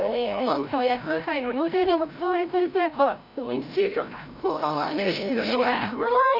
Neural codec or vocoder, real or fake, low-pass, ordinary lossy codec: codec, 16 kHz, 0.5 kbps, FreqCodec, larger model; fake; 5.4 kHz; none